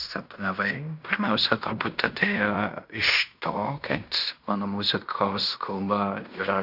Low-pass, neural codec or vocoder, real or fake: 5.4 kHz; codec, 16 kHz in and 24 kHz out, 0.9 kbps, LongCat-Audio-Codec, fine tuned four codebook decoder; fake